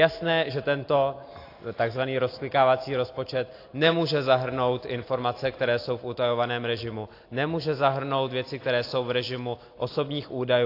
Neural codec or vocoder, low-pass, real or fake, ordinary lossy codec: none; 5.4 kHz; real; AAC, 32 kbps